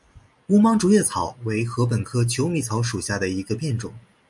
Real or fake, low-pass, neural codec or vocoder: real; 10.8 kHz; none